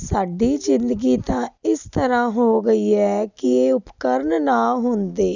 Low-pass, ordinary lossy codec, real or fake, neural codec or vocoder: 7.2 kHz; none; real; none